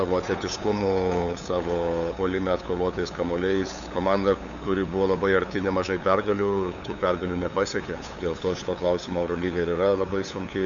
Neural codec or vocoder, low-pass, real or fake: codec, 16 kHz, 8 kbps, FunCodec, trained on LibriTTS, 25 frames a second; 7.2 kHz; fake